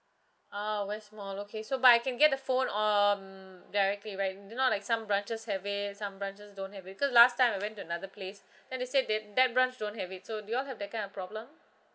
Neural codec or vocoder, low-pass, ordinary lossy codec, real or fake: none; none; none; real